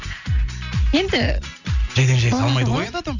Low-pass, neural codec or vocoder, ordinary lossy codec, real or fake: 7.2 kHz; none; none; real